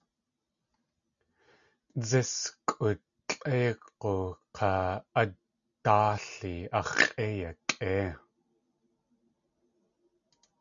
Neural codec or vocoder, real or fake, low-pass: none; real; 7.2 kHz